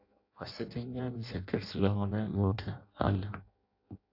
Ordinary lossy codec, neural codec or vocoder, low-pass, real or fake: AAC, 48 kbps; codec, 16 kHz in and 24 kHz out, 0.6 kbps, FireRedTTS-2 codec; 5.4 kHz; fake